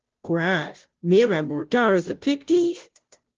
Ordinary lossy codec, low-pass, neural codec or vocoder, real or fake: Opus, 16 kbps; 7.2 kHz; codec, 16 kHz, 0.5 kbps, FunCodec, trained on Chinese and English, 25 frames a second; fake